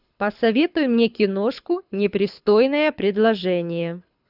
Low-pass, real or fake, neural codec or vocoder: 5.4 kHz; fake; codec, 24 kHz, 6 kbps, HILCodec